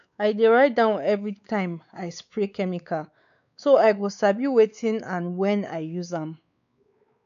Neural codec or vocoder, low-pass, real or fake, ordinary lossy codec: codec, 16 kHz, 4 kbps, X-Codec, WavLM features, trained on Multilingual LibriSpeech; 7.2 kHz; fake; none